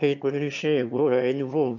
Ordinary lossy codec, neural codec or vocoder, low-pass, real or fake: none; autoencoder, 22.05 kHz, a latent of 192 numbers a frame, VITS, trained on one speaker; 7.2 kHz; fake